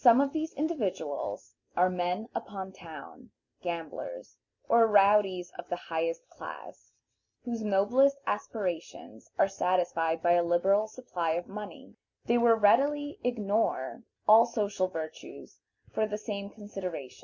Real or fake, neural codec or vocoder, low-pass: real; none; 7.2 kHz